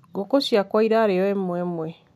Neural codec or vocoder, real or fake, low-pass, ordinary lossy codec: none; real; 14.4 kHz; none